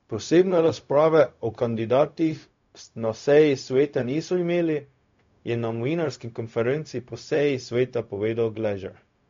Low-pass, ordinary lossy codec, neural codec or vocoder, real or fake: 7.2 kHz; MP3, 48 kbps; codec, 16 kHz, 0.4 kbps, LongCat-Audio-Codec; fake